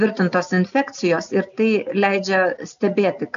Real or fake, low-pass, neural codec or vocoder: real; 7.2 kHz; none